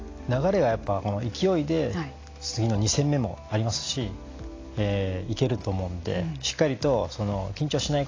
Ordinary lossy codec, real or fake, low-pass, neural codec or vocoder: AAC, 32 kbps; real; 7.2 kHz; none